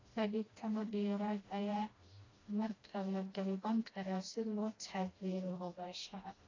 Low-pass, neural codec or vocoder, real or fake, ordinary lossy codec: 7.2 kHz; codec, 16 kHz, 1 kbps, FreqCodec, smaller model; fake; AAC, 32 kbps